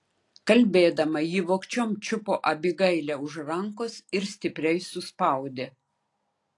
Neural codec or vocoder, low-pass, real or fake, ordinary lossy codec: none; 10.8 kHz; real; AAC, 48 kbps